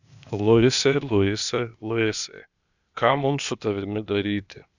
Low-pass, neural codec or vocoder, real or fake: 7.2 kHz; codec, 16 kHz, 0.8 kbps, ZipCodec; fake